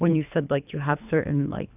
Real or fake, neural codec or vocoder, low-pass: fake; codec, 24 kHz, 3 kbps, HILCodec; 3.6 kHz